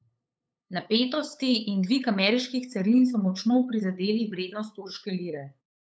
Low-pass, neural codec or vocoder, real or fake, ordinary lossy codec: none; codec, 16 kHz, 8 kbps, FunCodec, trained on LibriTTS, 25 frames a second; fake; none